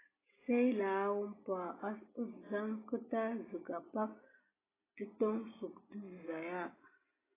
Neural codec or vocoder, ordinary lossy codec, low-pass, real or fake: none; AAC, 16 kbps; 3.6 kHz; real